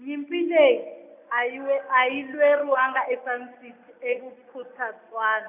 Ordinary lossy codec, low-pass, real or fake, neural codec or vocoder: none; 3.6 kHz; real; none